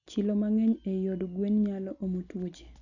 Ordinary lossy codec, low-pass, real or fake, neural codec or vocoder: none; 7.2 kHz; real; none